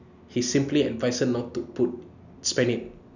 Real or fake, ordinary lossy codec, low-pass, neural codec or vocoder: real; none; 7.2 kHz; none